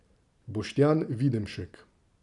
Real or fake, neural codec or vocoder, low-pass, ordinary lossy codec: fake; vocoder, 44.1 kHz, 128 mel bands every 512 samples, BigVGAN v2; 10.8 kHz; none